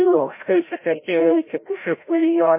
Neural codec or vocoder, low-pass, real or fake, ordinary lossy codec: codec, 16 kHz, 0.5 kbps, FreqCodec, larger model; 3.6 kHz; fake; MP3, 24 kbps